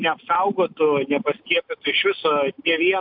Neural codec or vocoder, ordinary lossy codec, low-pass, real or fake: none; AAC, 48 kbps; 5.4 kHz; real